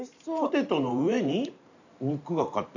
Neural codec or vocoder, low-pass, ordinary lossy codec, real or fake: vocoder, 44.1 kHz, 128 mel bands every 512 samples, BigVGAN v2; 7.2 kHz; none; fake